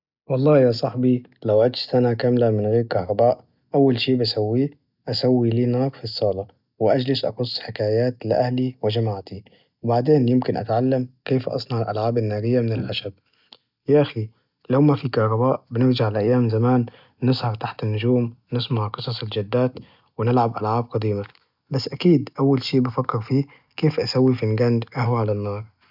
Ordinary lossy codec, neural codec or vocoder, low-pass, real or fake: none; none; 5.4 kHz; real